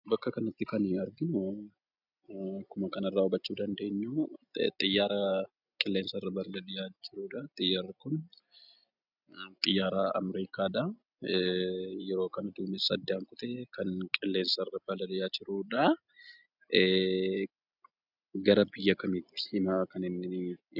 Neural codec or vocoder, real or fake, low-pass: none; real; 5.4 kHz